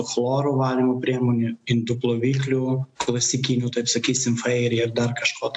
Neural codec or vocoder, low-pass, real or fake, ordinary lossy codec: none; 9.9 kHz; real; AAC, 64 kbps